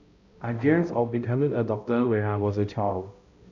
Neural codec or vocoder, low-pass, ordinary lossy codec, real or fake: codec, 16 kHz, 0.5 kbps, X-Codec, HuBERT features, trained on balanced general audio; 7.2 kHz; none; fake